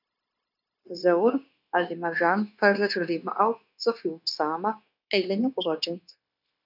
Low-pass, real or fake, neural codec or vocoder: 5.4 kHz; fake; codec, 16 kHz, 0.9 kbps, LongCat-Audio-Codec